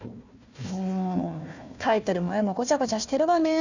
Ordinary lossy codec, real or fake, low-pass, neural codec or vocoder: none; fake; 7.2 kHz; codec, 16 kHz, 1 kbps, FunCodec, trained on Chinese and English, 50 frames a second